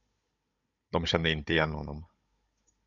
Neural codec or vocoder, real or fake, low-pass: codec, 16 kHz, 16 kbps, FunCodec, trained on Chinese and English, 50 frames a second; fake; 7.2 kHz